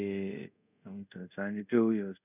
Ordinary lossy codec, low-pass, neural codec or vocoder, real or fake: none; 3.6 kHz; codec, 24 kHz, 0.5 kbps, DualCodec; fake